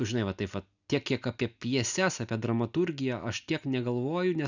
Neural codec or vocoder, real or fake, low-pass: none; real; 7.2 kHz